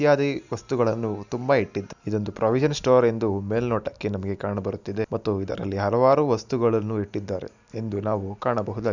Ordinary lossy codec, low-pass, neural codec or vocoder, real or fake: none; 7.2 kHz; none; real